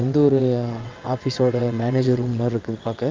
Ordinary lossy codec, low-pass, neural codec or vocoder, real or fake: Opus, 32 kbps; 7.2 kHz; vocoder, 22.05 kHz, 80 mel bands, WaveNeXt; fake